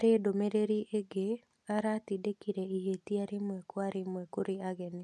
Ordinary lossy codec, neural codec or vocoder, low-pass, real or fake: none; none; none; real